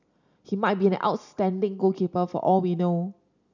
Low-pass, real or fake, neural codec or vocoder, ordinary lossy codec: 7.2 kHz; real; none; none